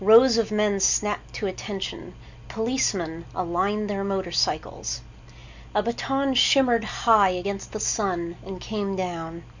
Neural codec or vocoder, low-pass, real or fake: none; 7.2 kHz; real